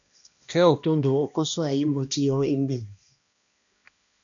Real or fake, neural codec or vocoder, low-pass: fake; codec, 16 kHz, 1 kbps, X-Codec, HuBERT features, trained on balanced general audio; 7.2 kHz